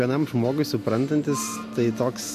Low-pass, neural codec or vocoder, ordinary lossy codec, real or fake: 14.4 kHz; none; MP3, 64 kbps; real